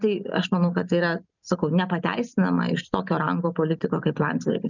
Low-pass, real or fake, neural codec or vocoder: 7.2 kHz; real; none